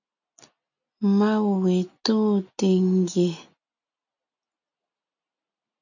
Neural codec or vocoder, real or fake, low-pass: none; real; 7.2 kHz